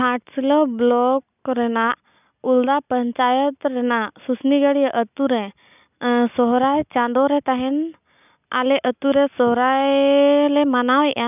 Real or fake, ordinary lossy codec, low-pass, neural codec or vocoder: real; none; 3.6 kHz; none